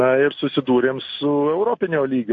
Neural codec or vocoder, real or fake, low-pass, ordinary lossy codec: none; real; 7.2 kHz; AAC, 48 kbps